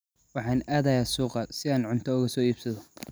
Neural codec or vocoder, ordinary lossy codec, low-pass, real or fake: none; none; none; real